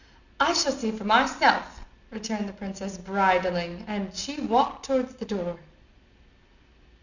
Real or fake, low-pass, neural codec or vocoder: real; 7.2 kHz; none